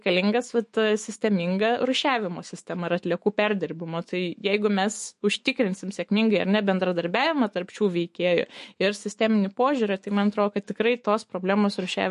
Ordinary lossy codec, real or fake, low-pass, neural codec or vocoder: MP3, 48 kbps; fake; 14.4 kHz; autoencoder, 48 kHz, 128 numbers a frame, DAC-VAE, trained on Japanese speech